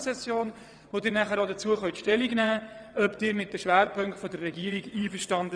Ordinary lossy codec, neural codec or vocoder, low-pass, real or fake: MP3, 96 kbps; vocoder, 22.05 kHz, 80 mel bands, WaveNeXt; 9.9 kHz; fake